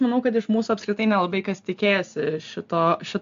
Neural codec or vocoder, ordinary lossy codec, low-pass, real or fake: none; AAC, 64 kbps; 7.2 kHz; real